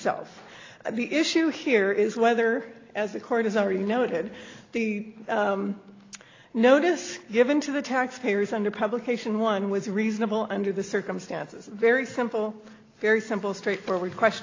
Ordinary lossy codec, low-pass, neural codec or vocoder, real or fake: AAC, 32 kbps; 7.2 kHz; none; real